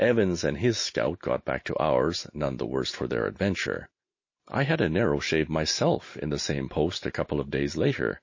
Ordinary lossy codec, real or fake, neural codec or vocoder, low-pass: MP3, 32 kbps; real; none; 7.2 kHz